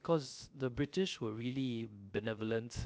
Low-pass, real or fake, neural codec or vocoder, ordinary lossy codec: none; fake; codec, 16 kHz, 0.3 kbps, FocalCodec; none